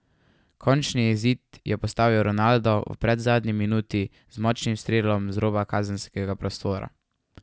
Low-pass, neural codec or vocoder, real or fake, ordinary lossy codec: none; none; real; none